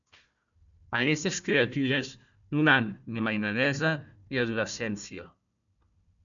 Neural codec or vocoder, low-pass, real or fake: codec, 16 kHz, 1 kbps, FunCodec, trained on Chinese and English, 50 frames a second; 7.2 kHz; fake